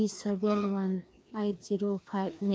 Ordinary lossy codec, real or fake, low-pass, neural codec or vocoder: none; fake; none; codec, 16 kHz, 2 kbps, FreqCodec, larger model